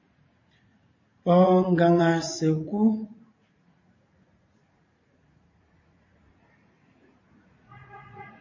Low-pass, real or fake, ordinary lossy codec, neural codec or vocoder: 7.2 kHz; fake; MP3, 32 kbps; vocoder, 44.1 kHz, 80 mel bands, Vocos